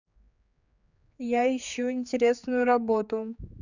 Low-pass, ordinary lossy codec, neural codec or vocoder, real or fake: 7.2 kHz; none; codec, 16 kHz, 4 kbps, X-Codec, HuBERT features, trained on general audio; fake